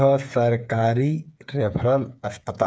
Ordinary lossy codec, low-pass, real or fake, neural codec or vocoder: none; none; fake; codec, 16 kHz, 8 kbps, FreqCodec, smaller model